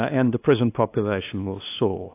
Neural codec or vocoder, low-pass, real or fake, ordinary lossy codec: codec, 16 kHz, 2 kbps, FunCodec, trained on LibriTTS, 25 frames a second; 3.6 kHz; fake; AAC, 24 kbps